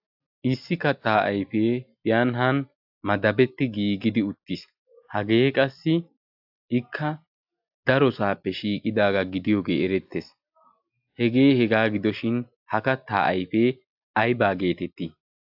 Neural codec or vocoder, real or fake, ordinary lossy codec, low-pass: none; real; AAC, 48 kbps; 5.4 kHz